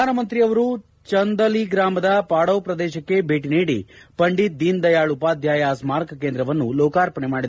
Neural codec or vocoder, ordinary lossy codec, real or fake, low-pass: none; none; real; none